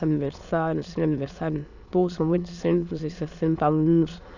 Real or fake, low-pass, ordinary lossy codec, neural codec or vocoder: fake; 7.2 kHz; none; autoencoder, 22.05 kHz, a latent of 192 numbers a frame, VITS, trained on many speakers